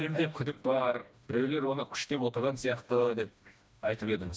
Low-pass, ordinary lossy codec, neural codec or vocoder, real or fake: none; none; codec, 16 kHz, 1 kbps, FreqCodec, smaller model; fake